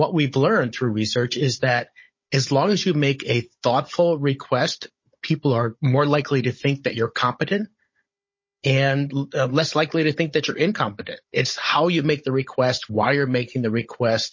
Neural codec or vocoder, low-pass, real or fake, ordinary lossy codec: codec, 16 kHz, 16 kbps, FunCodec, trained on Chinese and English, 50 frames a second; 7.2 kHz; fake; MP3, 32 kbps